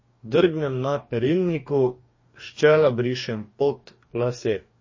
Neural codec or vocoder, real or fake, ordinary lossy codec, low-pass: codec, 44.1 kHz, 2.6 kbps, DAC; fake; MP3, 32 kbps; 7.2 kHz